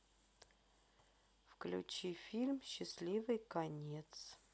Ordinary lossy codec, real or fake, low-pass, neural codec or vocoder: none; real; none; none